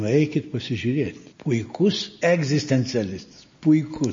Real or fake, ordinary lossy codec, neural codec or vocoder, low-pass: real; MP3, 32 kbps; none; 7.2 kHz